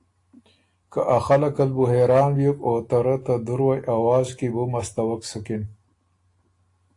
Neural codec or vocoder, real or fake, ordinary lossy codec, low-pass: none; real; AAC, 48 kbps; 10.8 kHz